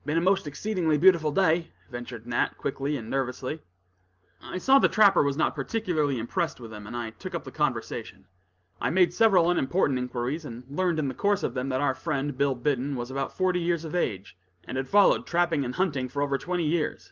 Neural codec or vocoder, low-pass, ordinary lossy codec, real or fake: none; 7.2 kHz; Opus, 32 kbps; real